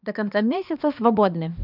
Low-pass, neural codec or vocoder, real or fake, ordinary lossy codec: 5.4 kHz; codec, 16 kHz, 2 kbps, X-Codec, HuBERT features, trained on balanced general audio; fake; none